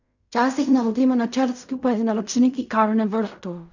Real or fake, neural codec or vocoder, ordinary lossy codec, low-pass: fake; codec, 16 kHz in and 24 kHz out, 0.4 kbps, LongCat-Audio-Codec, fine tuned four codebook decoder; none; 7.2 kHz